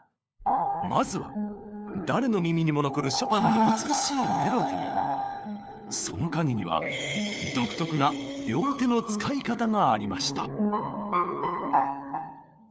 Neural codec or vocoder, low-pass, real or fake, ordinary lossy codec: codec, 16 kHz, 4 kbps, FunCodec, trained on LibriTTS, 50 frames a second; none; fake; none